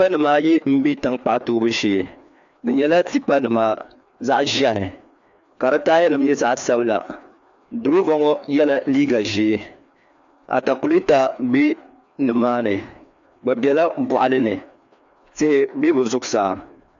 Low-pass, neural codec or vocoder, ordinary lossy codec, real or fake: 7.2 kHz; codec, 16 kHz, 2 kbps, FreqCodec, larger model; AAC, 64 kbps; fake